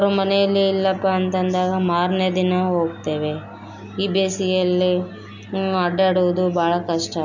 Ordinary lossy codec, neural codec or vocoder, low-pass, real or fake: none; none; 7.2 kHz; real